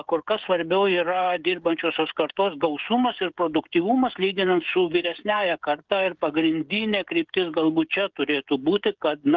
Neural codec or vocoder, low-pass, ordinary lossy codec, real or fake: codec, 16 kHz, 16 kbps, FreqCodec, smaller model; 7.2 kHz; Opus, 32 kbps; fake